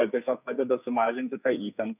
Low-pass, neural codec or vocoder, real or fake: 3.6 kHz; codec, 44.1 kHz, 2.6 kbps, SNAC; fake